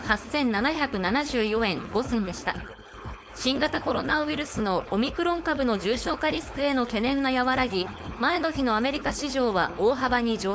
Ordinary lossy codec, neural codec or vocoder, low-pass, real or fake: none; codec, 16 kHz, 4.8 kbps, FACodec; none; fake